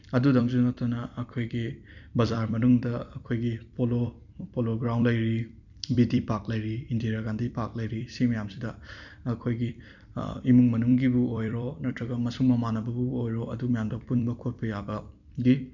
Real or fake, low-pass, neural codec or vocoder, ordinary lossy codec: fake; 7.2 kHz; vocoder, 44.1 kHz, 128 mel bands every 256 samples, BigVGAN v2; none